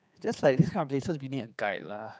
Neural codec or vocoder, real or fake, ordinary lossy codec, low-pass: codec, 16 kHz, 4 kbps, X-Codec, HuBERT features, trained on general audio; fake; none; none